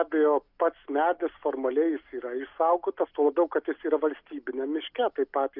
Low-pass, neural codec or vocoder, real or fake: 5.4 kHz; none; real